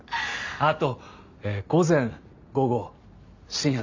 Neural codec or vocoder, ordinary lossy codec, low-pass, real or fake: none; MP3, 64 kbps; 7.2 kHz; real